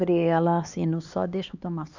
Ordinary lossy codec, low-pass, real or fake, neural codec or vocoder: none; 7.2 kHz; fake; codec, 16 kHz, 2 kbps, X-Codec, HuBERT features, trained on LibriSpeech